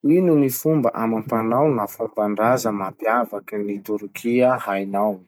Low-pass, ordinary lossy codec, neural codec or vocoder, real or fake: none; none; none; real